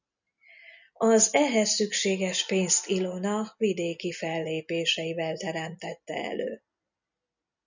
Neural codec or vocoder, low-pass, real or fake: none; 7.2 kHz; real